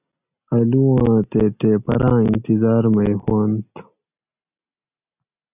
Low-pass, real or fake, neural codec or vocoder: 3.6 kHz; real; none